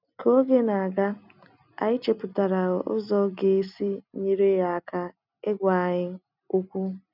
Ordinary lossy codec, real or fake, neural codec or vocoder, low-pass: none; real; none; 5.4 kHz